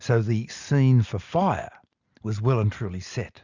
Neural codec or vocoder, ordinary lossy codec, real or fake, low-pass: none; Opus, 64 kbps; real; 7.2 kHz